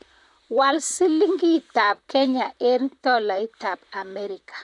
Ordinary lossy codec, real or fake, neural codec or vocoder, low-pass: none; fake; vocoder, 44.1 kHz, 128 mel bands, Pupu-Vocoder; 10.8 kHz